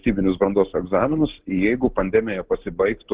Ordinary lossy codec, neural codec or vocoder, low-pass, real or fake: Opus, 16 kbps; none; 3.6 kHz; real